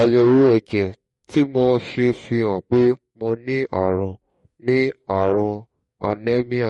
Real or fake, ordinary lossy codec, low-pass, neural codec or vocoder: fake; MP3, 48 kbps; 19.8 kHz; codec, 44.1 kHz, 2.6 kbps, DAC